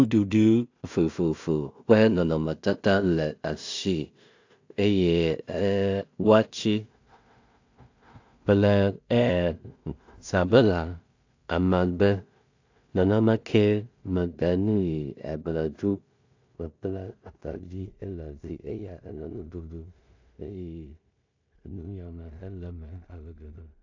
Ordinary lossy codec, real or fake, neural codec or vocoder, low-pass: AAC, 48 kbps; fake; codec, 16 kHz in and 24 kHz out, 0.4 kbps, LongCat-Audio-Codec, two codebook decoder; 7.2 kHz